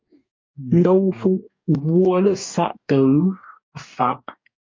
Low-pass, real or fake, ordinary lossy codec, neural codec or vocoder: 7.2 kHz; fake; MP3, 48 kbps; codec, 44.1 kHz, 2.6 kbps, DAC